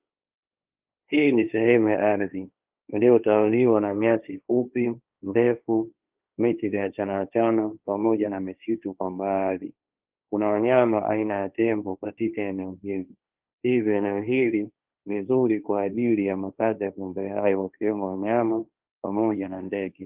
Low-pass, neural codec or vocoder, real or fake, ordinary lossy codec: 3.6 kHz; codec, 16 kHz, 1.1 kbps, Voila-Tokenizer; fake; Opus, 24 kbps